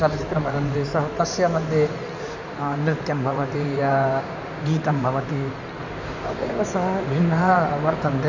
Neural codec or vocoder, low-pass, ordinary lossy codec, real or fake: codec, 16 kHz in and 24 kHz out, 2.2 kbps, FireRedTTS-2 codec; 7.2 kHz; none; fake